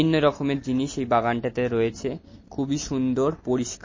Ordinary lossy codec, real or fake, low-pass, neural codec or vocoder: MP3, 32 kbps; real; 7.2 kHz; none